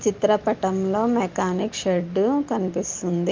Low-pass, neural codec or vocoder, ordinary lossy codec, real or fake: 7.2 kHz; none; Opus, 24 kbps; real